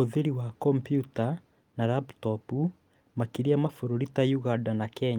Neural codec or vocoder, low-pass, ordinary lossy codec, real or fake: none; 19.8 kHz; Opus, 32 kbps; real